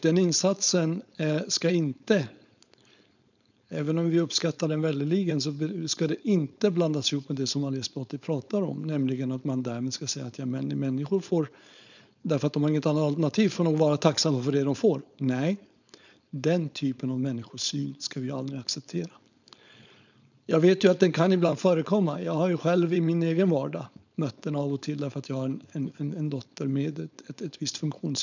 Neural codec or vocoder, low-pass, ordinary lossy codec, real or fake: codec, 16 kHz, 4.8 kbps, FACodec; 7.2 kHz; none; fake